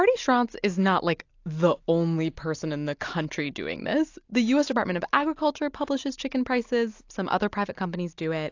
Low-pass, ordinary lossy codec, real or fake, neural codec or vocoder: 7.2 kHz; AAC, 48 kbps; real; none